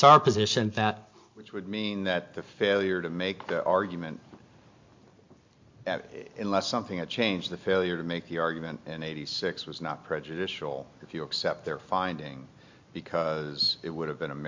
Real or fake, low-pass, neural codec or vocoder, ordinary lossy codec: real; 7.2 kHz; none; MP3, 48 kbps